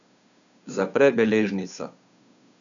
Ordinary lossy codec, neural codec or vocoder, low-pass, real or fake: none; codec, 16 kHz, 2 kbps, FunCodec, trained on Chinese and English, 25 frames a second; 7.2 kHz; fake